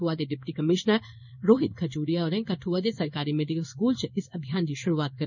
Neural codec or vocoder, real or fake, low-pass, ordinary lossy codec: codec, 16 kHz in and 24 kHz out, 1 kbps, XY-Tokenizer; fake; 7.2 kHz; none